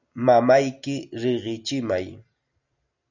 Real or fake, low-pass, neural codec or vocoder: real; 7.2 kHz; none